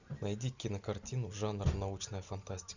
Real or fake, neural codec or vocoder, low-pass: real; none; 7.2 kHz